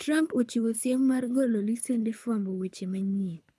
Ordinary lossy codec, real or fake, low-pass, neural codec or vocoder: none; fake; none; codec, 24 kHz, 6 kbps, HILCodec